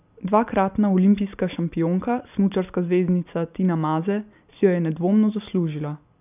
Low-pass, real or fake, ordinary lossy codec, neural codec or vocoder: 3.6 kHz; real; none; none